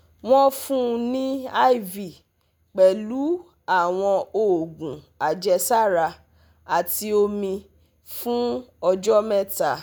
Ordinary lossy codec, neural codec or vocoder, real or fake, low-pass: none; none; real; none